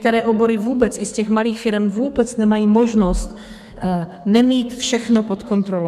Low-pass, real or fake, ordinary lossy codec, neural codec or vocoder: 14.4 kHz; fake; MP3, 96 kbps; codec, 32 kHz, 1.9 kbps, SNAC